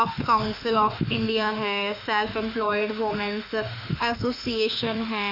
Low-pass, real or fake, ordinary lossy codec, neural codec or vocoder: 5.4 kHz; fake; none; autoencoder, 48 kHz, 32 numbers a frame, DAC-VAE, trained on Japanese speech